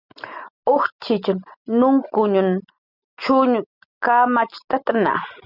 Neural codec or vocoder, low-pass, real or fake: none; 5.4 kHz; real